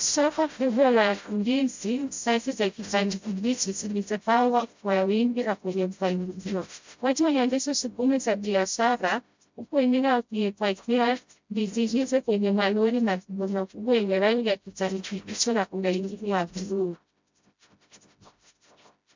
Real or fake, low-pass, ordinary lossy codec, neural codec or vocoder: fake; 7.2 kHz; MP3, 64 kbps; codec, 16 kHz, 0.5 kbps, FreqCodec, smaller model